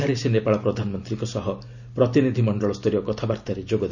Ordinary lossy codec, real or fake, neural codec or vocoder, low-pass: none; real; none; 7.2 kHz